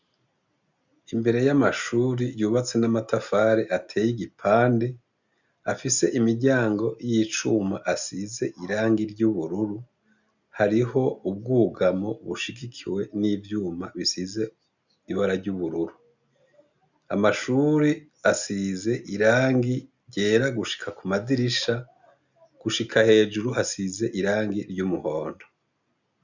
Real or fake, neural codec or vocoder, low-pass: real; none; 7.2 kHz